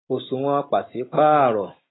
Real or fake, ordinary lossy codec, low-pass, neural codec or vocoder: real; AAC, 16 kbps; 7.2 kHz; none